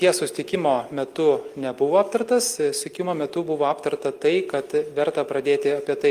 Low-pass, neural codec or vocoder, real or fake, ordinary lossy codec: 14.4 kHz; none; real; Opus, 32 kbps